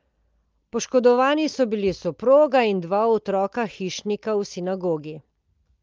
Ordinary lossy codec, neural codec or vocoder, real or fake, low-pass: Opus, 24 kbps; none; real; 7.2 kHz